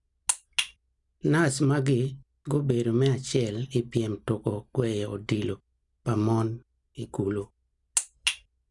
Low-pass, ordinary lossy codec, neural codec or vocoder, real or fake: 10.8 kHz; none; none; real